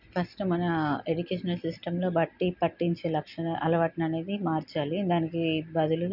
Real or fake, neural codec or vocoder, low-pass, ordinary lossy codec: real; none; 5.4 kHz; none